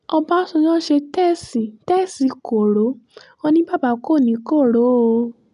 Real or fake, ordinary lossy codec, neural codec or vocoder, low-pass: real; none; none; 10.8 kHz